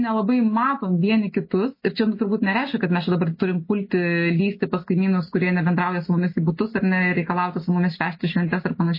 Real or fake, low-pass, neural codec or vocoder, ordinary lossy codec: real; 5.4 kHz; none; MP3, 24 kbps